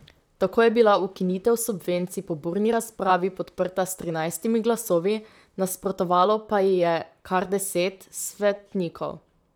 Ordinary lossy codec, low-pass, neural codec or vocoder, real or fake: none; none; vocoder, 44.1 kHz, 128 mel bands, Pupu-Vocoder; fake